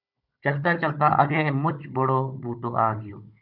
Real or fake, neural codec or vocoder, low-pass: fake; codec, 16 kHz, 16 kbps, FunCodec, trained on Chinese and English, 50 frames a second; 5.4 kHz